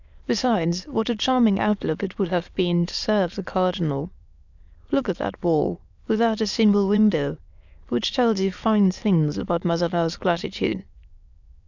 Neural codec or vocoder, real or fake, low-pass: autoencoder, 22.05 kHz, a latent of 192 numbers a frame, VITS, trained on many speakers; fake; 7.2 kHz